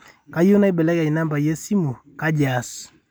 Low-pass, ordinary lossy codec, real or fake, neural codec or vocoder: none; none; real; none